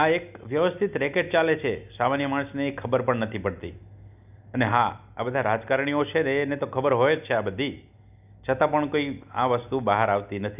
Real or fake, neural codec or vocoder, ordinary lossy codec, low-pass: real; none; none; 3.6 kHz